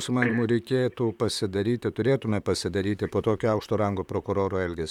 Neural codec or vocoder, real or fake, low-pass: vocoder, 44.1 kHz, 128 mel bands, Pupu-Vocoder; fake; 19.8 kHz